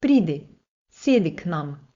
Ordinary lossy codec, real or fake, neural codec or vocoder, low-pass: Opus, 64 kbps; fake; codec, 16 kHz, 4.8 kbps, FACodec; 7.2 kHz